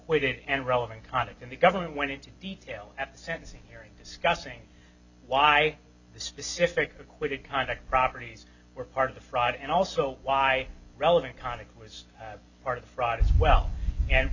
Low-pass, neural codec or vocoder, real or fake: 7.2 kHz; none; real